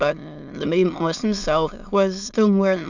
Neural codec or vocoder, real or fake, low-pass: autoencoder, 22.05 kHz, a latent of 192 numbers a frame, VITS, trained on many speakers; fake; 7.2 kHz